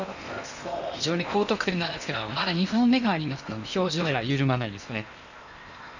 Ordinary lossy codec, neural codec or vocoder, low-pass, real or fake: none; codec, 16 kHz in and 24 kHz out, 0.8 kbps, FocalCodec, streaming, 65536 codes; 7.2 kHz; fake